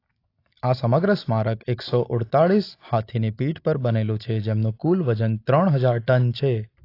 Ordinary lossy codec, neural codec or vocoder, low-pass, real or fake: AAC, 32 kbps; none; 5.4 kHz; real